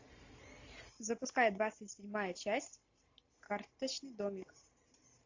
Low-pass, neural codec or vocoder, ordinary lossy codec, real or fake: 7.2 kHz; none; AAC, 48 kbps; real